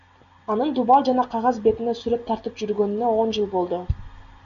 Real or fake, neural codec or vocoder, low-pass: real; none; 7.2 kHz